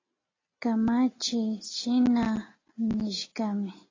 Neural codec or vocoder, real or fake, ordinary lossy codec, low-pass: none; real; AAC, 32 kbps; 7.2 kHz